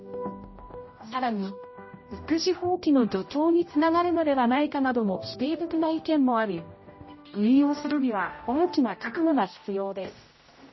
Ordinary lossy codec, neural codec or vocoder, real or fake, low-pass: MP3, 24 kbps; codec, 16 kHz, 0.5 kbps, X-Codec, HuBERT features, trained on general audio; fake; 7.2 kHz